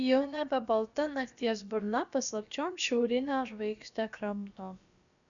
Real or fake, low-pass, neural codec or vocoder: fake; 7.2 kHz; codec, 16 kHz, about 1 kbps, DyCAST, with the encoder's durations